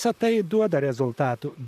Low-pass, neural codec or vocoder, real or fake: 14.4 kHz; vocoder, 44.1 kHz, 128 mel bands, Pupu-Vocoder; fake